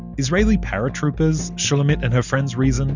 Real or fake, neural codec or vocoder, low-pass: real; none; 7.2 kHz